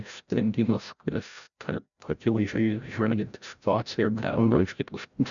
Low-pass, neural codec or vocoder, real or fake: 7.2 kHz; codec, 16 kHz, 0.5 kbps, FreqCodec, larger model; fake